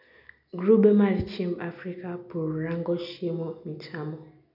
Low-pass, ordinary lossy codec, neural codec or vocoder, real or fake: 5.4 kHz; none; none; real